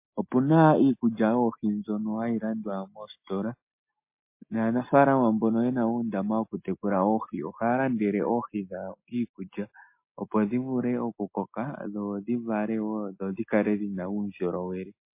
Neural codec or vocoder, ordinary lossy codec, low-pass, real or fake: none; MP3, 24 kbps; 3.6 kHz; real